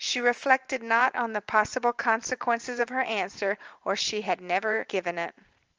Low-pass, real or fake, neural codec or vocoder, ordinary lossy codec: 7.2 kHz; fake; vocoder, 44.1 kHz, 128 mel bands every 512 samples, BigVGAN v2; Opus, 24 kbps